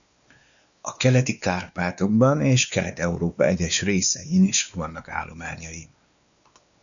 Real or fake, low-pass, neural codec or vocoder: fake; 7.2 kHz; codec, 16 kHz, 2 kbps, X-Codec, WavLM features, trained on Multilingual LibriSpeech